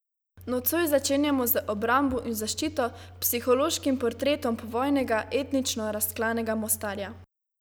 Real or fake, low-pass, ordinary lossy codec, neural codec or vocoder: real; none; none; none